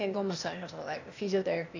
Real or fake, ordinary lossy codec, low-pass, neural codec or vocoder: fake; none; 7.2 kHz; codec, 16 kHz, 0.8 kbps, ZipCodec